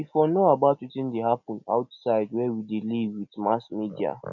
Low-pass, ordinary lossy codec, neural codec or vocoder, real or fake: 7.2 kHz; none; none; real